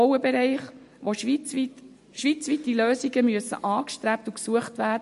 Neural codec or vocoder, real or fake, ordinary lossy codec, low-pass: none; real; MP3, 48 kbps; 14.4 kHz